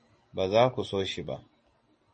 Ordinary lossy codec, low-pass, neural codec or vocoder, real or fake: MP3, 32 kbps; 10.8 kHz; none; real